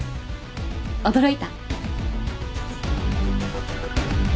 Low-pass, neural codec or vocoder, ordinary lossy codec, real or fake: none; none; none; real